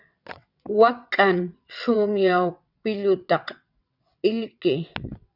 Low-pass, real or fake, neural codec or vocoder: 5.4 kHz; fake; vocoder, 22.05 kHz, 80 mel bands, WaveNeXt